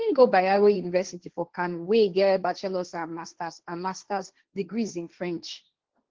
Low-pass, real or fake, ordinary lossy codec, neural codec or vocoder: 7.2 kHz; fake; Opus, 16 kbps; codec, 16 kHz, 1.1 kbps, Voila-Tokenizer